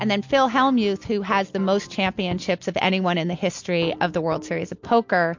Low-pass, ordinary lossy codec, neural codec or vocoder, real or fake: 7.2 kHz; MP3, 48 kbps; none; real